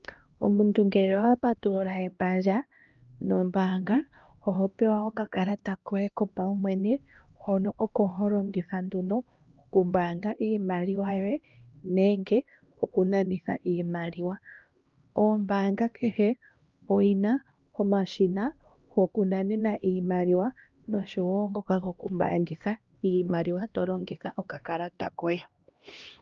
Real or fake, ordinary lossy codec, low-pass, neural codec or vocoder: fake; Opus, 24 kbps; 7.2 kHz; codec, 16 kHz, 1 kbps, X-Codec, HuBERT features, trained on LibriSpeech